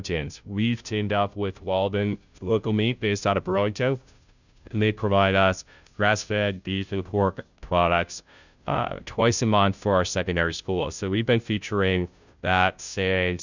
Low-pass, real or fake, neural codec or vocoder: 7.2 kHz; fake; codec, 16 kHz, 0.5 kbps, FunCodec, trained on Chinese and English, 25 frames a second